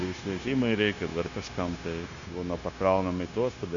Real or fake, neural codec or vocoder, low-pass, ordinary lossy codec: fake; codec, 16 kHz, 0.9 kbps, LongCat-Audio-Codec; 7.2 kHz; Opus, 64 kbps